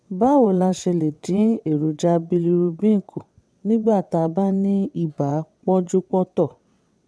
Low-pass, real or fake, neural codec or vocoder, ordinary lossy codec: none; fake; vocoder, 22.05 kHz, 80 mel bands, WaveNeXt; none